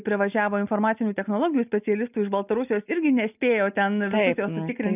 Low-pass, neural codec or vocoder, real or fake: 3.6 kHz; none; real